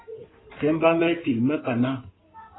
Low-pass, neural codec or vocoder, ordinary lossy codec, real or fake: 7.2 kHz; codec, 16 kHz in and 24 kHz out, 2.2 kbps, FireRedTTS-2 codec; AAC, 16 kbps; fake